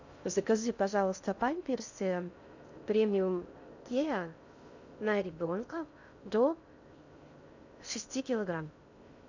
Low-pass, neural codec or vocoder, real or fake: 7.2 kHz; codec, 16 kHz in and 24 kHz out, 0.6 kbps, FocalCodec, streaming, 2048 codes; fake